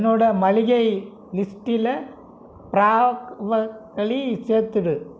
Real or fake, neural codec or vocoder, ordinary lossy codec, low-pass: real; none; none; none